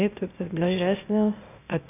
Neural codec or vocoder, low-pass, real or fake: codec, 16 kHz, 0.8 kbps, ZipCodec; 3.6 kHz; fake